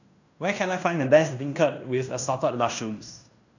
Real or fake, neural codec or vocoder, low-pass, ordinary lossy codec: fake; codec, 16 kHz in and 24 kHz out, 0.9 kbps, LongCat-Audio-Codec, fine tuned four codebook decoder; 7.2 kHz; none